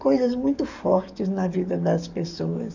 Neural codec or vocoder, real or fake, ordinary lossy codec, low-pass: codec, 44.1 kHz, 7.8 kbps, DAC; fake; none; 7.2 kHz